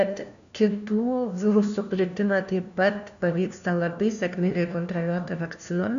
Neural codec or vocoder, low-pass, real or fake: codec, 16 kHz, 1 kbps, FunCodec, trained on LibriTTS, 50 frames a second; 7.2 kHz; fake